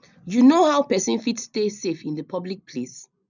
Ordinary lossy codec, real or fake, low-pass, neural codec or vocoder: none; real; 7.2 kHz; none